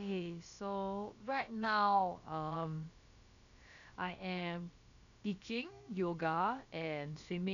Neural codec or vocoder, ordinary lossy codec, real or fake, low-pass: codec, 16 kHz, about 1 kbps, DyCAST, with the encoder's durations; MP3, 96 kbps; fake; 7.2 kHz